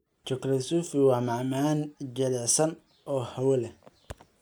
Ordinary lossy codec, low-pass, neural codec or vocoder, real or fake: none; none; none; real